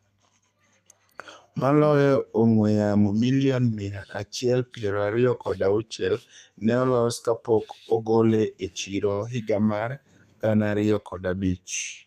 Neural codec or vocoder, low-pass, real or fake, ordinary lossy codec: codec, 32 kHz, 1.9 kbps, SNAC; 14.4 kHz; fake; none